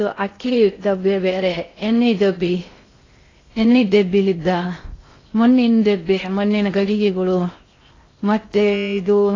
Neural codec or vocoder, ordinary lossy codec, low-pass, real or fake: codec, 16 kHz in and 24 kHz out, 0.6 kbps, FocalCodec, streaming, 4096 codes; AAC, 32 kbps; 7.2 kHz; fake